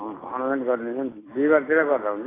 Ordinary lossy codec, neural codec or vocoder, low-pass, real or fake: AAC, 16 kbps; none; 3.6 kHz; real